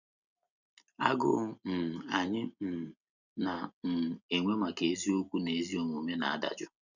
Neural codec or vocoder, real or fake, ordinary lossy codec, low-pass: none; real; none; 7.2 kHz